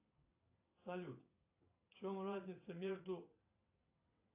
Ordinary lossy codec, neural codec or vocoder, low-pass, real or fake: AAC, 32 kbps; vocoder, 22.05 kHz, 80 mel bands, WaveNeXt; 3.6 kHz; fake